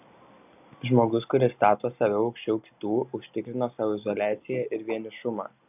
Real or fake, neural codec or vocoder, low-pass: real; none; 3.6 kHz